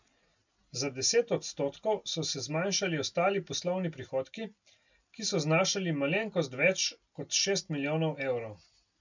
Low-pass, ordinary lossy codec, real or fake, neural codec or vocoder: 7.2 kHz; none; real; none